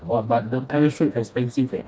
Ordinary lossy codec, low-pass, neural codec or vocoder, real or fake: none; none; codec, 16 kHz, 1 kbps, FreqCodec, smaller model; fake